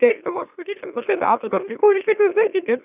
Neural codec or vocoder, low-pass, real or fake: autoencoder, 44.1 kHz, a latent of 192 numbers a frame, MeloTTS; 3.6 kHz; fake